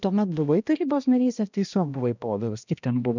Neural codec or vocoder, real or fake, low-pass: codec, 16 kHz, 1 kbps, X-Codec, HuBERT features, trained on balanced general audio; fake; 7.2 kHz